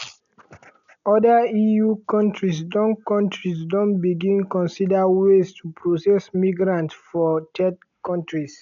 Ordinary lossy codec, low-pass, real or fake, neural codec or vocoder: none; 7.2 kHz; real; none